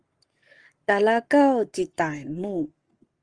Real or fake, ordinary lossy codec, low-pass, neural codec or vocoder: fake; Opus, 24 kbps; 9.9 kHz; codec, 44.1 kHz, 7.8 kbps, Pupu-Codec